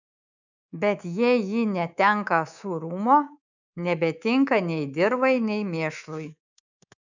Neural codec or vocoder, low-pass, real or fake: codec, 24 kHz, 3.1 kbps, DualCodec; 7.2 kHz; fake